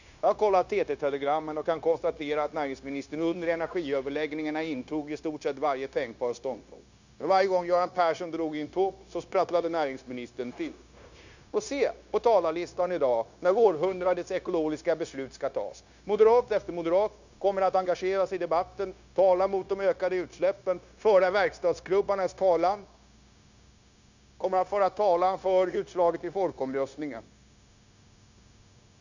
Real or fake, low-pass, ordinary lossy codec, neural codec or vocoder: fake; 7.2 kHz; none; codec, 16 kHz, 0.9 kbps, LongCat-Audio-Codec